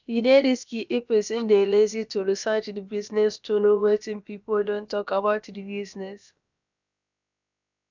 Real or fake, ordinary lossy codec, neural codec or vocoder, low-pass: fake; none; codec, 16 kHz, about 1 kbps, DyCAST, with the encoder's durations; 7.2 kHz